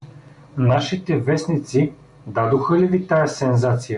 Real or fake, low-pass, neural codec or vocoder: real; 10.8 kHz; none